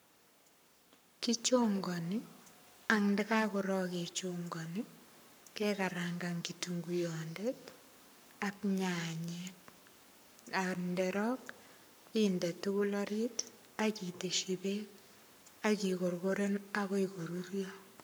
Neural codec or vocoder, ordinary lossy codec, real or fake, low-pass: codec, 44.1 kHz, 7.8 kbps, Pupu-Codec; none; fake; none